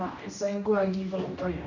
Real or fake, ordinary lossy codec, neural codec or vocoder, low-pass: fake; none; codec, 16 kHz, 1 kbps, X-Codec, HuBERT features, trained on general audio; 7.2 kHz